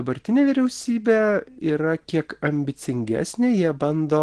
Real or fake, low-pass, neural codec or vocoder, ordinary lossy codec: real; 9.9 kHz; none; Opus, 16 kbps